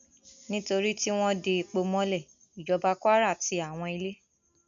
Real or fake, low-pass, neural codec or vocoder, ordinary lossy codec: real; 7.2 kHz; none; none